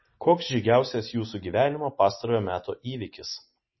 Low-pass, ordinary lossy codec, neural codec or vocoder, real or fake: 7.2 kHz; MP3, 24 kbps; none; real